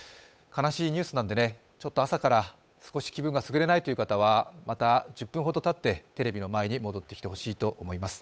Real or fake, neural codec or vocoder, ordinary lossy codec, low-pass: fake; codec, 16 kHz, 8 kbps, FunCodec, trained on Chinese and English, 25 frames a second; none; none